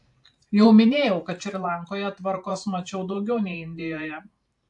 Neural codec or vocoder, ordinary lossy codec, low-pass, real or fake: vocoder, 44.1 kHz, 128 mel bands every 512 samples, BigVGAN v2; AAC, 64 kbps; 10.8 kHz; fake